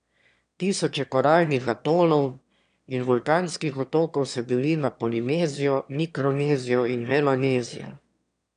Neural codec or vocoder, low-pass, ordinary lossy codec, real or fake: autoencoder, 22.05 kHz, a latent of 192 numbers a frame, VITS, trained on one speaker; 9.9 kHz; none; fake